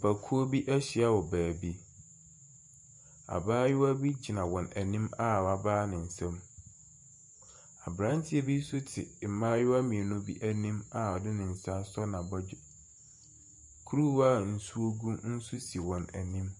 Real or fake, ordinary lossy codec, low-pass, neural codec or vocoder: fake; MP3, 48 kbps; 10.8 kHz; vocoder, 48 kHz, 128 mel bands, Vocos